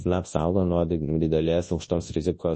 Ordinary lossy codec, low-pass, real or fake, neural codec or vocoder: MP3, 32 kbps; 10.8 kHz; fake; codec, 24 kHz, 0.9 kbps, WavTokenizer, large speech release